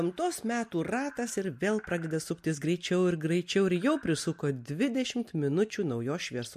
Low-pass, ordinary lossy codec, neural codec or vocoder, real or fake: 14.4 kHz; MP3, 64 kbps; none; real